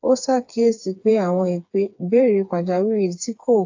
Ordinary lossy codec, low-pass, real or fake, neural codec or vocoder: AAC, 48 kbps; 7.2 kHz; fake; codec, 16 kHz, 4 kbps, FreqCodec, smaller model